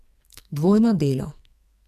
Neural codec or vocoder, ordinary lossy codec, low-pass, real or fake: codec, 44.1 kHz, 2.6 kbps, SNAC; MP3, 96 kbps; 14.4 kHz; fake